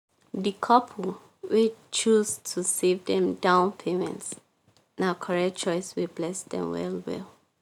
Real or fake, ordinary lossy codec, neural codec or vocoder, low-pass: real; none; none; 19.8 kHz